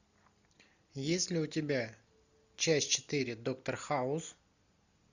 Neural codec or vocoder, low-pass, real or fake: none; 7.2 kHz; real